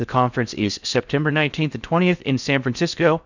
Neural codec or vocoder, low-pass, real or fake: codec, 16 kHz in and 24 kHz out, 0.6 kbps, FocalCodec, streaming, 4096 codes; 7.2 kHz; fake